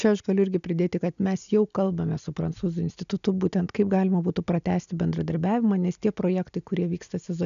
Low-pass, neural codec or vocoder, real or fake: 7.2 kHz; none; real